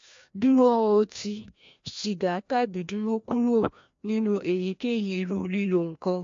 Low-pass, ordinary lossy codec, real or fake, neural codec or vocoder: 7.2 kHz; MP3, 48 kbps; fake; codec, 16 kHz, 1 kbps, FreqCodec, larger model